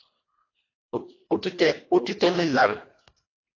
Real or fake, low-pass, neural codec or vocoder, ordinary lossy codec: fake; 7.2 kHz; codec, 24 kHz, 1.5 kbps, HILCodec; AAC, 32 kbps